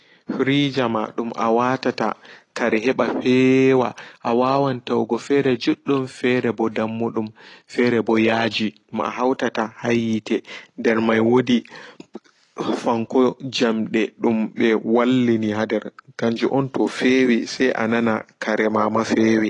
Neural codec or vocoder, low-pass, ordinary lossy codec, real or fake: none; 10.8 kHz; AAC, 32 kbps; real